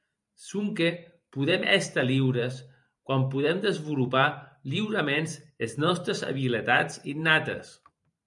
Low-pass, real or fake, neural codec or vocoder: 10.8 kHz; fake; vocoder, 44.1 kHz, 128 mel bands every 512 samples, BigVGAN v2